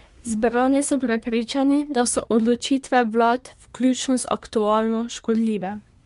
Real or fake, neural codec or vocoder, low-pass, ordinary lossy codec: fake; codec, 24 kHz, 1 kbps, SNAC; 10.8 kHz; MP3, 64 kbps